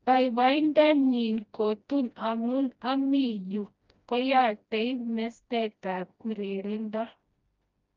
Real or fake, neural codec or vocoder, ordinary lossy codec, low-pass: fake; codec, 16 kHz, 1 kbps, FreqCodec, smaller model; Opus, 32 kbps; 7.2 kHz